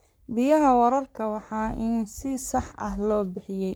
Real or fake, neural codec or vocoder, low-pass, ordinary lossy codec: fake; codec, 44.1 kHz, 3.4 kbps, Pupu-Codec; none; none